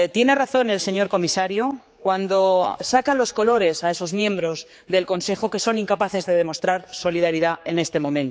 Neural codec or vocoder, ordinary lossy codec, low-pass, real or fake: codec, 16 kHz, 4 kbps, X-Codec, HuBERT features, trained on general audio; none; none; fake